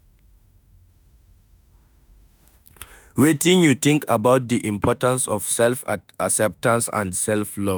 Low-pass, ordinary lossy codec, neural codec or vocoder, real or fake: none; none; autoencoder, 48 kHz, 32 numbers a frame, DAC-VAE, trained on Japanese speech; fake